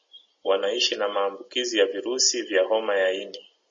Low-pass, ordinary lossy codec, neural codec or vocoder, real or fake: 7.2 kHz; MP3, 32 kbps; none; real